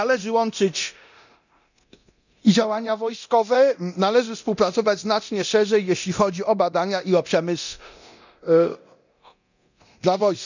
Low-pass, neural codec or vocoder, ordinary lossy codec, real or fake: 7.2 kHz; codec, 24 kHz, 0.9 kbps, DualCodec; none; fake